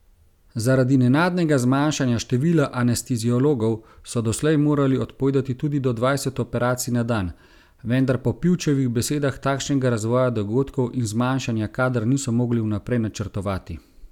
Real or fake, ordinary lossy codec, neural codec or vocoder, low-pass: real; none; none; 19.8 kHz